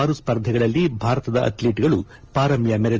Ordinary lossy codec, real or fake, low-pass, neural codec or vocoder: Opus, 24 kbps; real; 7.2 kHz; none